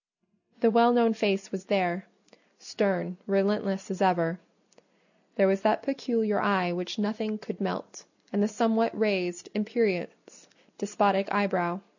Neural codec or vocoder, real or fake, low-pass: none; real; 7.2 kHz